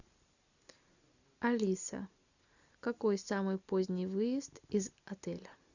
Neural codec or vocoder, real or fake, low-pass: none; real; 7.2 kHz